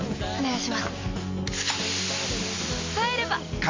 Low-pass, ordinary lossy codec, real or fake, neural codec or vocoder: 7.2 kHz; MP3, 48 kbps; real; none